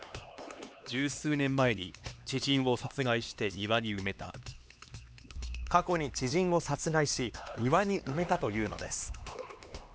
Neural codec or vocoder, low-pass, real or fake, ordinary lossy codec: codec, 16 kHz, 2 kbps, X-Codec, HuBERT features, trained on LibriSpeech; none; fake; none